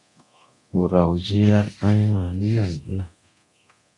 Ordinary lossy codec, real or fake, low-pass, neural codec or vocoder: AAC, 64 kbps; fake; 10.8 kHz; codec, 24 kHz, 0.9 kbps, DualCodec